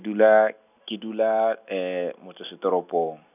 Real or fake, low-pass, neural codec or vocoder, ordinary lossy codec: real; 3.6 kHz; none; none